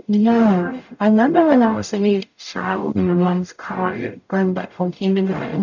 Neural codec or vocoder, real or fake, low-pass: codec, 44.1 kHz, 0.9 kbps, DAC; fake; 7.2 kHz